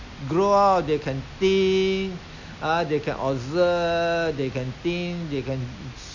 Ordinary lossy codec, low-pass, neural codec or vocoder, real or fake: none; 7.2 kHz; none; real